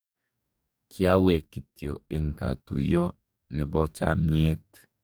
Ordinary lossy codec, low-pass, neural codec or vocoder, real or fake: none; none; codec, 44.1 kHz, 2.6 kbps, DAC; fake